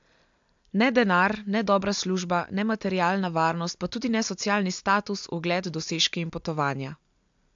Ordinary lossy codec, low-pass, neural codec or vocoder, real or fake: AAC, 64 kbps; 7.2 kHz; none; real